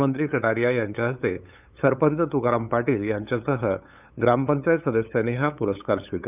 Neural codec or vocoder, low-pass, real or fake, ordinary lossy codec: codec, 16 kHz, 8 kbps, FunCodec, trained on LibriTTS, 25 frames a second; 3.6 kHz; fake; none